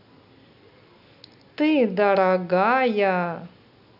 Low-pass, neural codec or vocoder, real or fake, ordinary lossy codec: 5.4 kHz; none; real; none